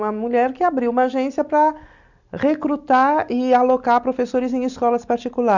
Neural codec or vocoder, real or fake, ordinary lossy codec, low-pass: none; real; none; 7.2 kHz